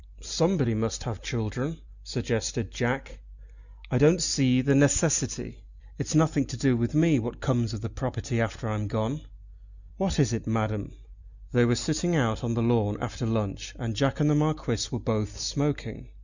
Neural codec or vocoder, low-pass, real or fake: none; 7.2 kHz; real